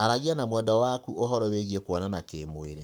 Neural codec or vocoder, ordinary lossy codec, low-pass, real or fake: codec, 44.1 kHz, 7.8 kbps, Pupu-Codec; none; none; fake